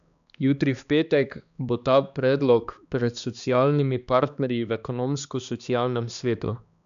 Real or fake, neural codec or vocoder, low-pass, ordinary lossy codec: fake; codec, 16 kHz, 2 kbps, X-Codec, HuBERT features, trained on balanced general audio; 7.2 kHz; none